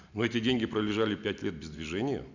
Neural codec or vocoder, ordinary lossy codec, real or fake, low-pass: none; none; real; 7.2 kHz